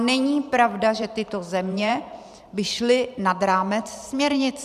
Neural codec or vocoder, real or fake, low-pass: vocoder, 44.1 kHz, 128 mel bands every 512 samples, BigVGAN v2; fake; 14.4 kHz